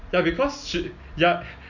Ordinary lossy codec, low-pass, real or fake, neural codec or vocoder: none; 7.2 kHz; real; none